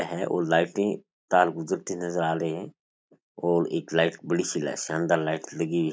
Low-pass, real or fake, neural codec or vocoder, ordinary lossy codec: none; real; none; none